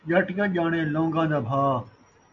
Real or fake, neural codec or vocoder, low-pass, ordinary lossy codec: real; none; 7.2 kHz; MP3, 48 kbps